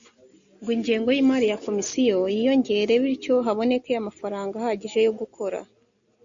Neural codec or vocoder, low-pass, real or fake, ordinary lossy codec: none; 7.2 kHz; real; MP3, 64 kbps